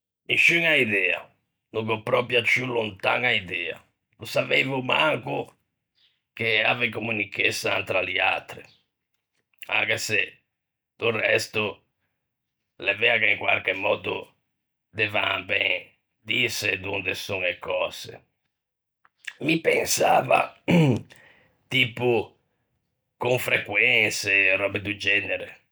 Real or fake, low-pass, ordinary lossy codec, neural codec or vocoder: real; none; none; none